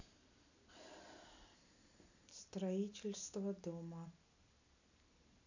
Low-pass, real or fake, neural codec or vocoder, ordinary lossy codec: 7.2 kHz; real; none; none